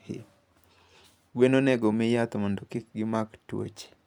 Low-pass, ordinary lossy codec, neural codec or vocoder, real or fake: 19.8 kHz; none; vocoder, 44.1 kHz, 128 mel bands every 256 samples, BigVGAN v2; fake